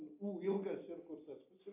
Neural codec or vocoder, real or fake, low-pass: none; real; 3.6 kHz